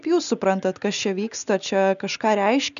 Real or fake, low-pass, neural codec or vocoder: real; 7.2 kHz; none